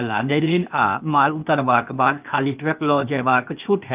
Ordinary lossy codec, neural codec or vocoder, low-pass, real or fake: Opus, 24 kbps; codec, 16 kHz, 0.8 kbps, ZipCodec; 3.6 kHz; fake